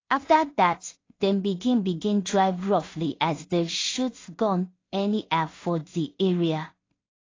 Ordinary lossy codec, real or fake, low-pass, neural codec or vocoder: AAC, 32 kbps; fake; 7.2 kHz; codec, 16 kHz in and 24 kHz out, 0.4 kbps, LongCat-Audio-Codec, two codebook decoder